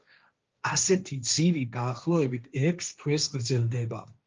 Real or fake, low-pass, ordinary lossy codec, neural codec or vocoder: fake; 7.2 kHz; Opus, 24 kbps; codec, 16 kHz, 1.1 kbps, Voila-Tokenizer